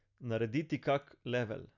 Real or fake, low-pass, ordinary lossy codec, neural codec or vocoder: real; 7.2 kHz; none; none